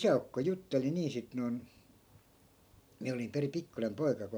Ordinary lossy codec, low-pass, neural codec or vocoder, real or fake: none; none; none; real